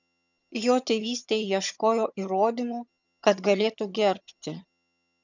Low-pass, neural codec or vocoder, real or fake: 7.2 kHz; vocoder, 22.05 kHz, 80 mel bands, HiFi-GAN; fake